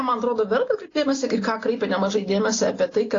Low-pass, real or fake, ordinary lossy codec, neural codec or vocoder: 7.2 kHz; real; AAC, 32 kbps; none